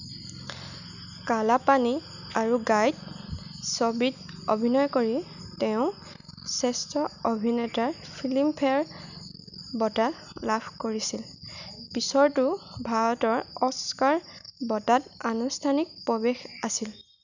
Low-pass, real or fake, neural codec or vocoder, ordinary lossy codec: 7.2 kHz; real; none; none